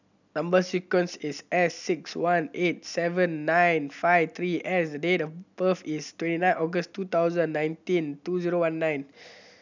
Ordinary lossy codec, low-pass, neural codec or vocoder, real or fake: none; 7.2 kHz; none; real